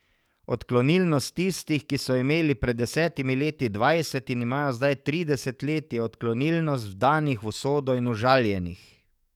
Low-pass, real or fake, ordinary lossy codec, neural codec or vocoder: 19.8 kHz; fake; none; codec, 44.1 kHz, 7.8 kbps, DAC